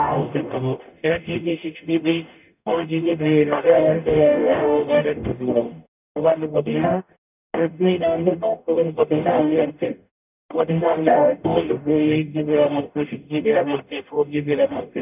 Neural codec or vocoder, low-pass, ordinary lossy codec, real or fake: codec, 44.1 kHz, 0.9 kbps, DAC; 3.6 kHz; none; fake